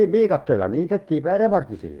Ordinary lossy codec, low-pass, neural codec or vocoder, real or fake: Opus, 32 kbps; 19.8 kHz; codec, 44.1 kHz, 2.6 kbps, DAC; fake